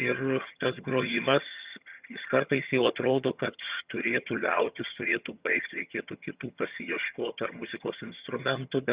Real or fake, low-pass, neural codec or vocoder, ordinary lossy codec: fake; 3.6 kHz; vocoder, 22.05 kHz, 80 mel bands, HiFi-GAN; Opus, 64 kbps